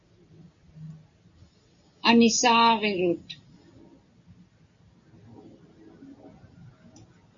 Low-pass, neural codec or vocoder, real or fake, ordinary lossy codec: 7.2 kHz; none; real; MP3, 64 kbps